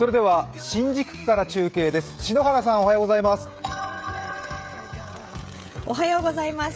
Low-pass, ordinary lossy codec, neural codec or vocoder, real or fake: none; none; codec, 16 kHz, 16 kbps, FreqCodec, smaller model; fake